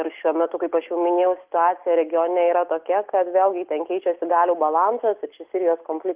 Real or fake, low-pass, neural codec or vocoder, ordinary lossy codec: real; 3.6 kHz; none; Opus, 32 kbps